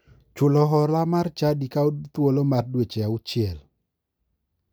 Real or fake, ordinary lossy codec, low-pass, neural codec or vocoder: real; none; none; none